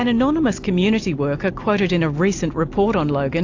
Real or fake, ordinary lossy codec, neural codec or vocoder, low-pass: real; AAC, 48 kbps; none; 7.2 kHz